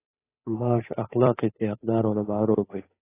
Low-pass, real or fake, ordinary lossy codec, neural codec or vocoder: 3.6 kHz; fake; AAC, 16 kbps; codec, 16 kHz, 8 kbps, FunCodec, trained on Chinese and English, 25 frames a second